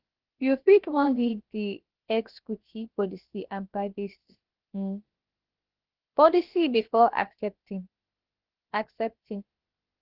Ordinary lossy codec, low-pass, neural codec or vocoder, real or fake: Opus, 16 kbps; 5.4 kHz; codec, 16 kHz, about 1 kbps, DyCAST, with the encoder's durations; fake